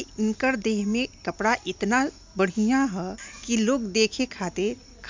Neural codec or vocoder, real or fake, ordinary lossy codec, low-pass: none; real; MP3, 64 kbps; 7.2 kHz